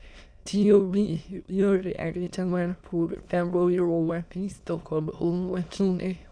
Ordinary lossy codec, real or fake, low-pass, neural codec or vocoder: none; fake; 9.9 kHz; autoencoder, 22.05 kHz, a latent of 192 numbers a frame, VITS, trained on many speakers